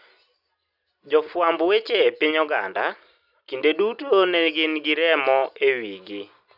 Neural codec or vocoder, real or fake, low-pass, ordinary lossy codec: none; real; 5.4 kHz; none